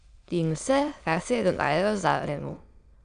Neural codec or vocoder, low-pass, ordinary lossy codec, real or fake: autoencoder, 22.05 kHz, a latent of 192 numbers a frame, VITS, trained on many speakers; 9.9 kHz; none; fake